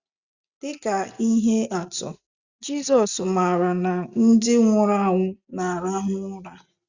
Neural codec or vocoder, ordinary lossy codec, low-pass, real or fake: none; Opus, 64 kbps; 7.2 kHz; real